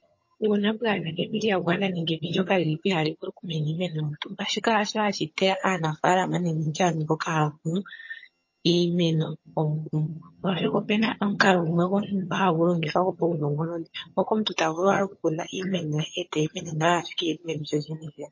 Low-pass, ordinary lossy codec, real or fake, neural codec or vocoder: 7.2 kHz; MP3, 32 kbps; fake; vocoder, 22.05 kHz, 80 mel bands, HiFi-GAN